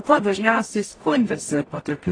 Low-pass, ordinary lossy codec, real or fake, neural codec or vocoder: 9.9 kHz; AAC, 32 kbps; fake; codec, 44.1 kHz, 0.9 kbps, DAC